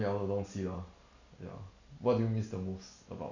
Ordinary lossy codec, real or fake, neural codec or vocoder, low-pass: none; real; none; 7.2 kHz